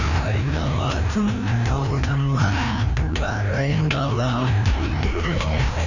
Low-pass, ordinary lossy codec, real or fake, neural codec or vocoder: 7.2 kHz; none; fake; codec, 16 kHz, 1 kbps, FreqCodec, larger model